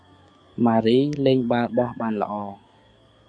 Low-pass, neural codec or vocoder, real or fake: 9.9 kHz; codec, 44.1 kHz, 7.8 kbps, DAC; fake